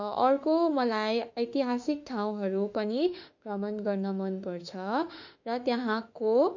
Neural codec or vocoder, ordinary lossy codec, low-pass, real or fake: autoencoder, 48 kHz, 32 numbers a frame, DAC-VAE, trained on Japanese speech; none; 7.2 kHz; fake